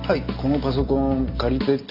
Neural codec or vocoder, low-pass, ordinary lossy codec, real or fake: none; 5.4 kHz; none; real